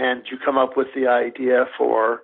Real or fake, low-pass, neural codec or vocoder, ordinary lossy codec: real; 5.4 kHz; none; MP3, 32 kbps